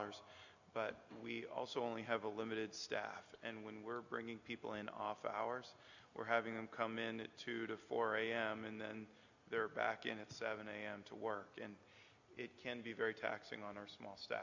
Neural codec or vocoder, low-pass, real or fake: none; 7.2 kHz; real